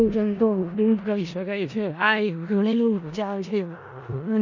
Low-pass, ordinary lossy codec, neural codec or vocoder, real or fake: 7.2 kHz; none; codec, 16 kHz in and 24 kHz out, 0.4 kbps, LongCat-Audio-Codec, four codebook decoder; fake